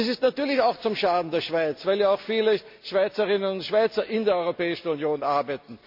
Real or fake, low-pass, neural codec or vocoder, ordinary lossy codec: real; 5.4 kHz; none; MP3, 48 kbps